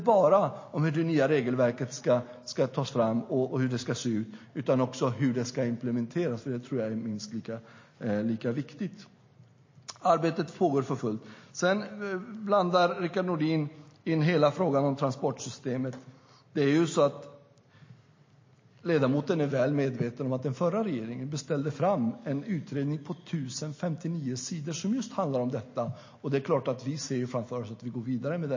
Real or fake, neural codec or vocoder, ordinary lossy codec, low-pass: real; none; MP3, 32 kbps; 7.2 kHz